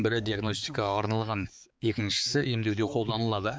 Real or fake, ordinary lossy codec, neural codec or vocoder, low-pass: fake; none; codec, 16 kHz, 4 kbps, X-Codec, HuBERT features, trained on balanced general audio; none